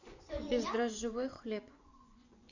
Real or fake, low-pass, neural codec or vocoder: fake; 7.2 kHz; vocoder, 24 kHz, 100 mel bands, Vocos